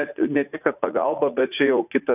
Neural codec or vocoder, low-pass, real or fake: vocoder, 44.1 kHz, 80 mel bands, Vocos; 3.6 kHz; fake